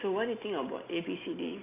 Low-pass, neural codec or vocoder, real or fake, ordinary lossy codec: 3.6 kHz; none; real; AAC, 24 kbps